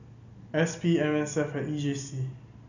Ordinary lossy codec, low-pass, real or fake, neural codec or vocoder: none; 7.2 kHz; real; none